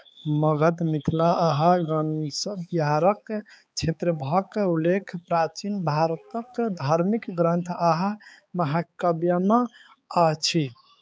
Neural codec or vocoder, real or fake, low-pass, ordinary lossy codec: codec, 16 kHz, 4 kbps, X-Codec, HuBERT features, trained on balanced general audio; fake; none; none